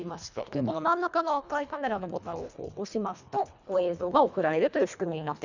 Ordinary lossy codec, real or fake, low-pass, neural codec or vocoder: none; fake; 7.2 kHz; codec, 24 kHz, 1.5 kbps, HILCodec